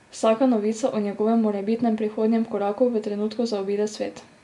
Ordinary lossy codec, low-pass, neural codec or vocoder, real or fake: none; 10.8 kHz; none; real